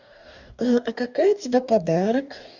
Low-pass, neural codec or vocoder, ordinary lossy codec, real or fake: 7.2 kHz; codec, 44.1 kHz, 2.6 kbps, DAC; Opus, 64 kbps; fake